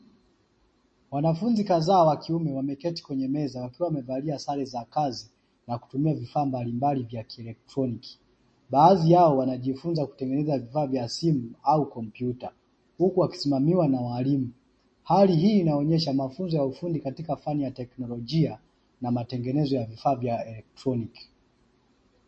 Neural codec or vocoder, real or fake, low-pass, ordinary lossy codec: none; real; 9.9 kHz; MP3, 32 kbps